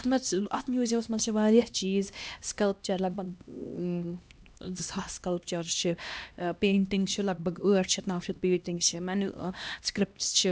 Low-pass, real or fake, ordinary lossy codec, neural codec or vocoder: none; fake; none; codec, 16 kHz, 1 kbps, X-Codec, HuBERT features, trained on LibriSpeech